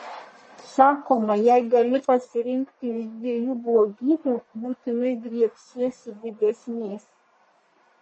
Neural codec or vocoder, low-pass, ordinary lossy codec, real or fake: codec, 44.1 kHz, 1.7 kbps, Pupu-Codec; 10.8 kHz; MP3, 32 kbps; fake